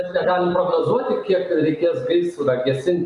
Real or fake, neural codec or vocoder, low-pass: real; none; 10.8 kHz